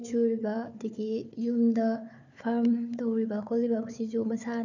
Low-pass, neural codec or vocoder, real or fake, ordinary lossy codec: 7.2 kHz; codec, 16 kHz, 4 kbps, FunCodec, trained on Chinese and English, 50 frames a second; fake; none